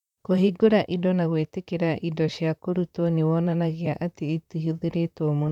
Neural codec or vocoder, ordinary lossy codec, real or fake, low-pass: vocoder, 44.1 kHz, 128 mel bands, Pupu-Vocoder; none; fake; 19.8 kHz